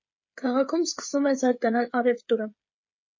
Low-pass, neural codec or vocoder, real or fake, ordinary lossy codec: 7.2 kHz; codec, 16 kHz, 16 kbps, FreqCodec, smaller model; fake; MP3, 32 kbps